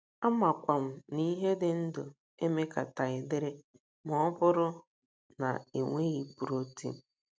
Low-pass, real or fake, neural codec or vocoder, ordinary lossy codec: none; real; none; none